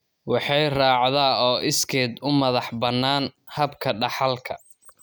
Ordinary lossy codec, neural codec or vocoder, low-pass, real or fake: none; none; none; real